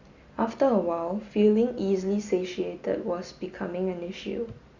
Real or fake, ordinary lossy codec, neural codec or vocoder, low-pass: real; Opus, 64 kbps; none; 7.2 kHz